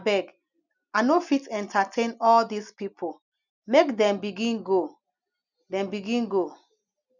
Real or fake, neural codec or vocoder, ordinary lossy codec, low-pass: real; none; none; 7.2 kHz